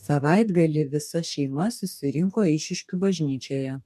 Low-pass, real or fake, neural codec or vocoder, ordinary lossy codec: 14.4 kHz; fake; codec, 44.1 kHz, 2.6 kbps, DAC; MP3, 96 kbps